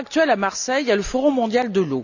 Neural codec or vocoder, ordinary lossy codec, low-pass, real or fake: none; none; 7.2 kHz; real